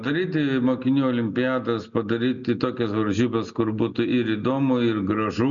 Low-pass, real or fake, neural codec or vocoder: 7.2 kHz; real; none